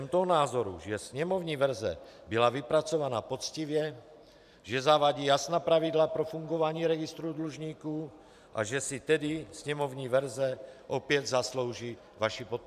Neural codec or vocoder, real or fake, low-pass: vocoder, 44.1 kHz, 128 mel bands every 512 samples, BigVGAN v2; fake; 14.4 kHz